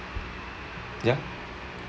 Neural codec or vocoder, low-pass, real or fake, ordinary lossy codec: none; none; real; none